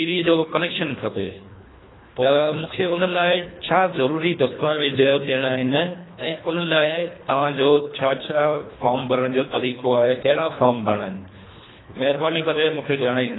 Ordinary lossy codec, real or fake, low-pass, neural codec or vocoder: AAC, 16 kbps; fake; 7.2 kHz; codec, 24 kHz, 1.5 kbps, HILCodec